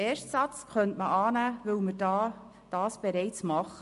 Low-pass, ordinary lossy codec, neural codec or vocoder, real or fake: 14.4 kHz; MP3, 48 kbps; none; real